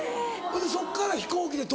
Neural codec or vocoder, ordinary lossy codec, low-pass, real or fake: none; none; none; real